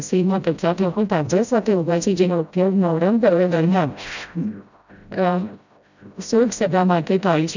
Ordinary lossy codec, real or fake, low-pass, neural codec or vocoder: none; fake; 7.2 kHz; codec, 16 kHz, 0.5 kbps, FreqCodec, smaller model